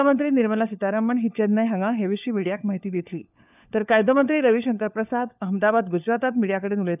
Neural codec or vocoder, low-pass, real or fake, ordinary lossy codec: codec, 16 kHz, 4 kbps, FunCodec, trained on LibriTTS, 50 frames a second; 3.6 kHz; fake; none